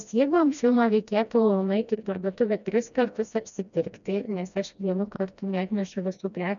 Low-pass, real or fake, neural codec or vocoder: 7.2 kHz; fake; codec, 16 kHz, 1 kbps, FreqCodec, smaller model